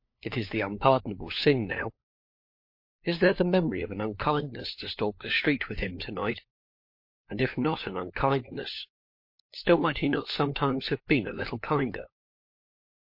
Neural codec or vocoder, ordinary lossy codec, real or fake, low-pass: codec, 16 kHz, 4 kbps, FunCodec, trained on LibriTTS, 50 frames a second; MP3, 32 kbps; fake; 5.4 kHz